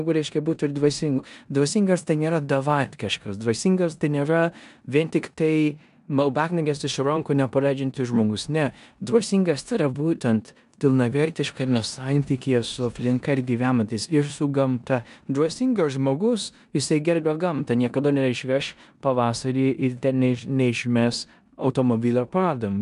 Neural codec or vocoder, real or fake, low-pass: codec, 16 kHz in and 24 kHz out, 0.9 kbps, LongCat-Audio-Codec, four codebook decoder; fake; 10.8 kHz